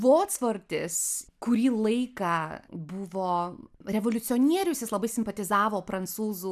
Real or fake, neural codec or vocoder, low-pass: real; none; 14.4 kHz